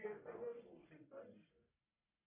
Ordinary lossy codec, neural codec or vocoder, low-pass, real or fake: MP3, 24 kbps; codec, 44.1 kHz, 1.7 kbps, Pupu-Codec; 3.6 kHz; fake